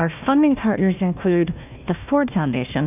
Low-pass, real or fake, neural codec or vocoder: 3.6 kHz; fake; codec, 16 kHz, 1 kbps, FunCodec, trained on Chinese and English, 50 frames a second